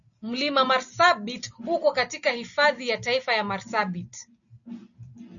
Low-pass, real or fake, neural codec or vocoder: 7.2 kHz; real; none